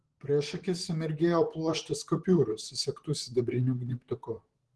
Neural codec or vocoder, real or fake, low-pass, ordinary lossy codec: vocoder, 44.1 kHz, 128 mel bands, Pupu-Vocoder; fake; 10.8 kHz; Opus, 16 kbps